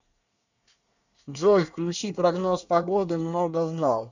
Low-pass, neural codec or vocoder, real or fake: 7.2 kHz; codec, 24 kHz, 1 kbps, SNAC; fake